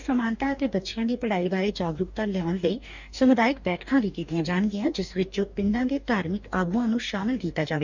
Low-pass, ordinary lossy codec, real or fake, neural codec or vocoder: 7.2 kHz; none; fake; codec, 44.1 kHz, 2.6 kbps, DAC